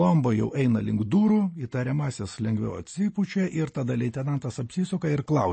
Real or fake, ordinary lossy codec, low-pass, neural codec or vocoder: real; MP3, 32 kbps; 9.9 kHz; none